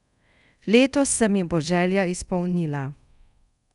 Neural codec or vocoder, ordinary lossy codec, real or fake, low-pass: codec, 24 kHz, 0.5 kbps, DualCodec; none; fake; 10.8 kHz